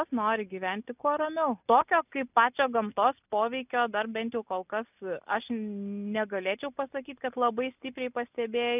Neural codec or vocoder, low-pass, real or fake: none; 3.6 kHz; real